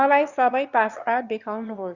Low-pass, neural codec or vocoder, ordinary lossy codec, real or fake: 7.2 kHz; autoencoder, 22.05 kHz, a latent of 192 numbers a frame, VITS, trained on one speaker; Opus, 64 kbps; fake